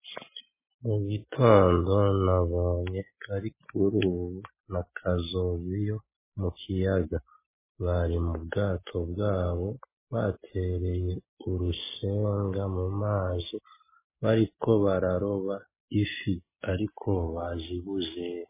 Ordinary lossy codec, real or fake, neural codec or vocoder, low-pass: MP3, 16 kbps; real; none; 3.6 kHz